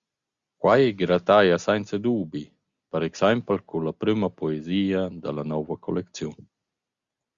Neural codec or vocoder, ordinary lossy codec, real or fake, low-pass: none; Opus, 64 kbps; real; 7.2 kHz